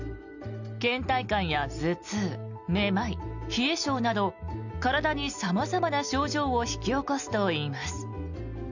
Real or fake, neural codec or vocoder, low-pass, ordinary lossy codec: real; none; 7.2 kHz; none